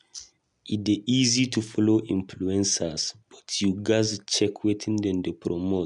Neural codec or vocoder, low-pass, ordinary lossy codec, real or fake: none; 10.8 kHz; none; real